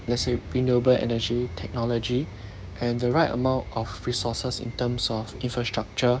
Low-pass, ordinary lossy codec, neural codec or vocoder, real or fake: none; none; codec, 16 kHz, 6 kbps, DAC; fake